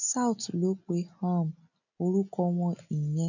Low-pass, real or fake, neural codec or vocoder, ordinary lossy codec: 7.2 kHz; real; none; none